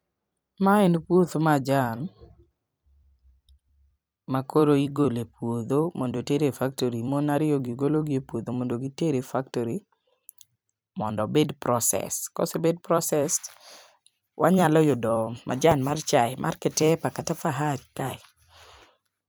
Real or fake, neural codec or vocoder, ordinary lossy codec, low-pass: fake; vocoder, 44.1 kHz, 128 mel bands every 256 samples, BigVGAN v2; none; none